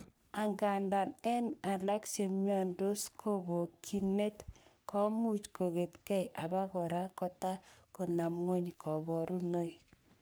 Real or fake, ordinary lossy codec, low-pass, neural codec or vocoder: fake; none; none; codec, 44.1 kHz, 3.4 kbps, Pupu-Codec